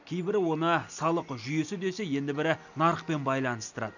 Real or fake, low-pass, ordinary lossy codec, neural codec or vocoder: real; 7.2 kHz; none; none